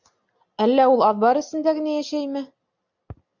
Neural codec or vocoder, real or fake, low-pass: none; real; 7.2 kHz